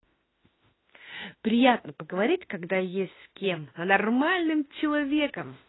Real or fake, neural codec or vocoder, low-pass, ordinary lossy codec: fake; autoencoder, 48 kHz, 32 numbers a frame, DAC-VAE, trained on Japanese speech; 7.2 kHz; AAC, 16 kbps